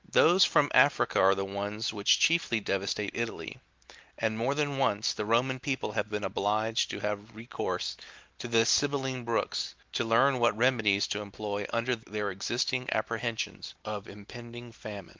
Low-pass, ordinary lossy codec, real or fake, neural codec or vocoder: 7.2 kHz; Opus, 32 kbps; real; none